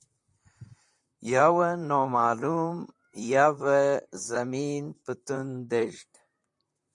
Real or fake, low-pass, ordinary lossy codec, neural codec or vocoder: fake; 10.8 kHz; MP3, 64 kbps; vocoder, 44.1 kHz, 128 mel bands, Pupu-Vocoder